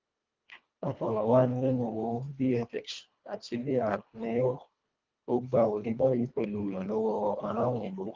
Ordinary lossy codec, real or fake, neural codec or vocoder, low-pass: Opus, 32 kbps; fake; codec, 24 kHz, 1.5 kbps, HILCodec; 7.2 kHz